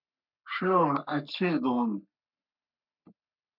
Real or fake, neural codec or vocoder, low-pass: fake; codec, 44.1 kHz, 3.4 kbps, Pupu-Codec; 5.4 kHz